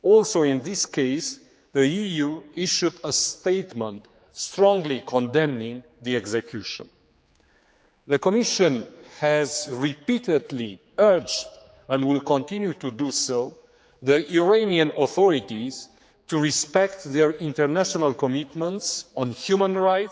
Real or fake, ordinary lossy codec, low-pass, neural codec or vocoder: fake; none; none; codec, 16 kHz, 4 kbps, X-Codec, HuBERT features, trained on general audio